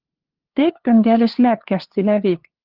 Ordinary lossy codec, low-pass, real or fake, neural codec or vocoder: Opus, 16 kbps; 5.4 kHz; fake; codec, 16 kHz, 2 kbps, FunCodec, trained on LibriTTS, 25 frames a second